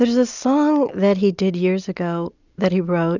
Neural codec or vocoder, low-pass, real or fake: none; 7.2 kHz; real